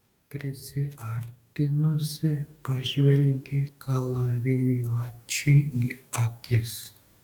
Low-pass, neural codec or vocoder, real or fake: 19.8 kHz; codec, 44.1 kHz, 2.6 kbps, DAC; fake